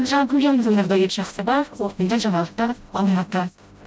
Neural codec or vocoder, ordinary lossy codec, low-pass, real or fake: codec, 16 kHz, 0.5 kbps, FreqCodec, smaller model; none; none; fake